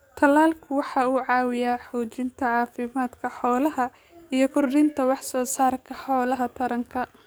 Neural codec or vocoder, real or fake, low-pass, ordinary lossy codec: codec, 44.1 kHz, 7.8 kbps, DAC; fake; none; none